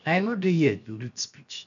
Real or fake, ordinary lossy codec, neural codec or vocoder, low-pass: fake; none; codec, 16 kHz, 0.7 kbps, FocalCodec; 7.2 kHz